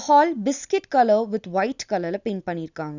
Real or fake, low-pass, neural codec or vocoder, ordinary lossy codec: real; 7.2 kHz; none; none